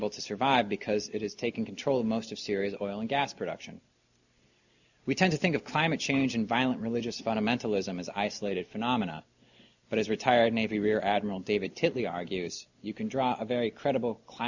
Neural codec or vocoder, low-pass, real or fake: none; 7.2 kHz; real